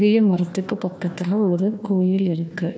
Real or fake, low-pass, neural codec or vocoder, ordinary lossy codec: fake; none; codec, 16 kHz, 1 kbps, FunCodec, trained on Chinese and English, 50 frames a second; none